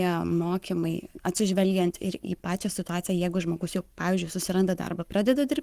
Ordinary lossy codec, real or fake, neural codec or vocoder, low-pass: Opus, 32 kbps; fake; codec, 44.1 kHz, 7.8 kbps, Pupu-Codec; 14.4 kHz